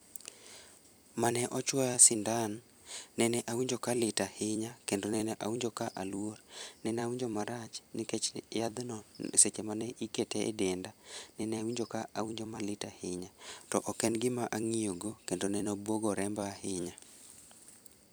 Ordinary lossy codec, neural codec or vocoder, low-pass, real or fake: none; vocoder, 44.1 kHz, 128 mel bands every 256 samples, BigVGAN v2; none; fake